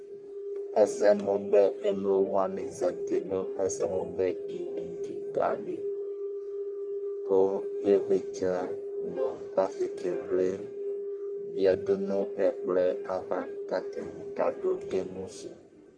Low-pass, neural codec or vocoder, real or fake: 9.9 kHz; codec, 44.1 kHz, 1.7 kbps, Pupu-Codec; fake